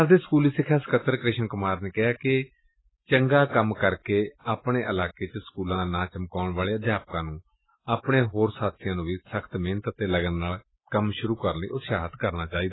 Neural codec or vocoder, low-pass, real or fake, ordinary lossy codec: none; 7.2 kHz; real; AAC, 16 kbps